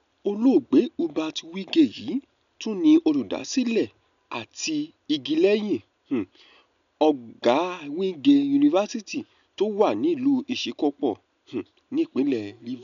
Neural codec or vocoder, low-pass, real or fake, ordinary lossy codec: none; 7.2 kHz; real; none